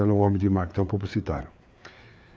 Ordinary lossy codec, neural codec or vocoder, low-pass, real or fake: none; codec, 16 kHz, 4 kbps, FreqCodec, larger model; none; fake